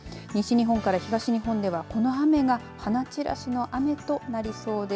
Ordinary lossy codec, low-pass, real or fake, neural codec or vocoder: none; none; real; none